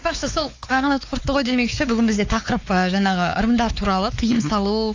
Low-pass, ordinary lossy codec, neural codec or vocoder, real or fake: 7.2 kHz; AAC, 48 kbps; codec, 16 kHz, 4 kbps, X-Codec, WavLM features, trained on Multilingual LibriSpeech; fake